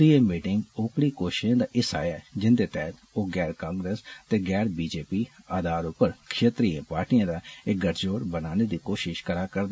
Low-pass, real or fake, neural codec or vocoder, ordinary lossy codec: none; real; none; none